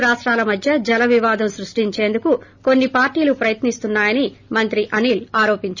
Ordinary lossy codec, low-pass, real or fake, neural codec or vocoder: none; 7.2 kHz; real; none